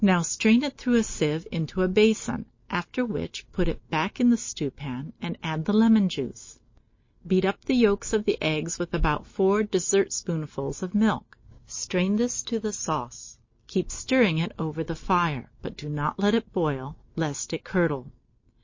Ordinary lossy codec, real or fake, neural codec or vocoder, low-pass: MP3, 32 kbps; real; none; 7.2 kHz